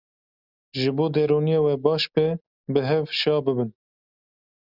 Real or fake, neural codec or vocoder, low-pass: real; none; 5.4 kHz